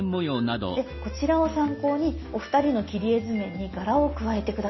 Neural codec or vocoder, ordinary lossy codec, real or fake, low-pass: none; MP3, 24 kbps; real; 7.2 kHz